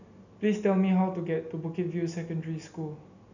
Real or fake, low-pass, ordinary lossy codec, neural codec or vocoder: real; 7.2 kHz; none; none